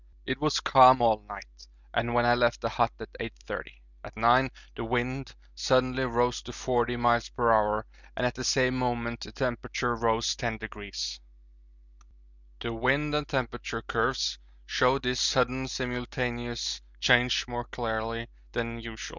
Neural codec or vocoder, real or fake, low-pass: none; real; 7.2 kHz